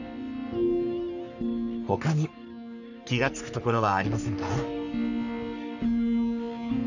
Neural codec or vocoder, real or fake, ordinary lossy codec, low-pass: codec, 44.1 kHz, 3.4 kbps, Pupu-Codec; fake; none; 7.2 kHz